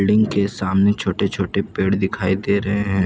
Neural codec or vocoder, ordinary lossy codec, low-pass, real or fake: none; none; none; real